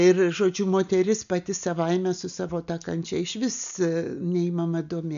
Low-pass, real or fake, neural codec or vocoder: 7.2 kHz; real; none